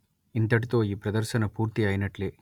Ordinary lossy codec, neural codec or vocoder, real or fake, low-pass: none; none; real; 19.8 kHz